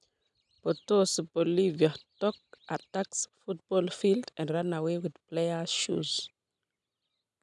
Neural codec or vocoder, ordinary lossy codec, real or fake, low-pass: none; none; real; 10.8 kHz